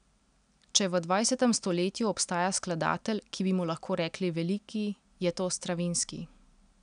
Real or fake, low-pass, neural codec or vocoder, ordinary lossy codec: real; 9.9 kHz; none; none